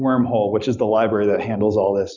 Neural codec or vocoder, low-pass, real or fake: none; 7.2 kHz; real